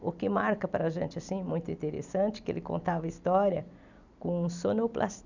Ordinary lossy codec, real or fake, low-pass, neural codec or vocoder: none; real; 7.2 kHz; none